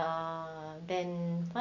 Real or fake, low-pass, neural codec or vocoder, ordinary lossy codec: real; 7.2 kHz; none; none